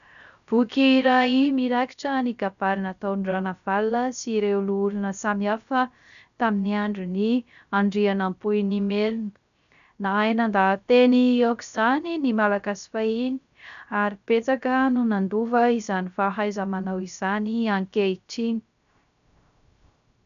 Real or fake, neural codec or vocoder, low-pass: fake; codec, 16 kHz, 0.3 kbps, FocalCodec; 7.2 kHz